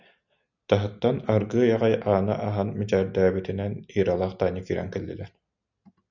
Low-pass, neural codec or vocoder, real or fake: 7.2 kHz; none; real